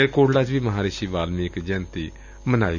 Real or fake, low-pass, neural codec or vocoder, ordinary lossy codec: real; 7.2 kHz; none; none